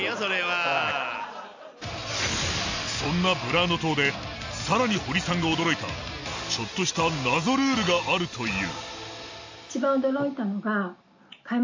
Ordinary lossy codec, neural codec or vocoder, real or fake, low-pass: AAC, 48 kbps; none; real; 7.2 kHz